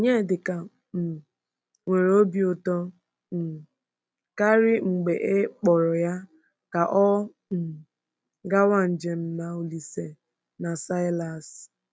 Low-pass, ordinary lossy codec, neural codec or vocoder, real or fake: none; none; none; real